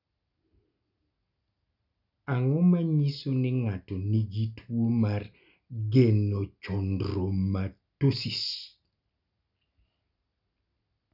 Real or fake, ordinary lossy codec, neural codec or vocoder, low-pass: real; none; none; 5.4 kHz